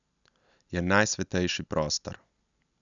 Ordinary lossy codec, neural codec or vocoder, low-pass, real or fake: none; none; 7.2 kHz; real